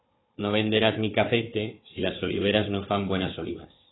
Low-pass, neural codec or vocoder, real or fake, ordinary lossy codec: 7.2 kHz; codec, 16 kHz, 4 kbps, FunCodec, trained on Chinese and English, 50 frames a second; fake; AAC, 16 kbps